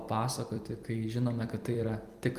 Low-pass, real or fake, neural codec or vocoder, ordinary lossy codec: 14.4 kHz; fake; vocoder, 44.1 kHz, 128 mel bands every 256 samples, BigVGAN v2; Opus, 32 kbps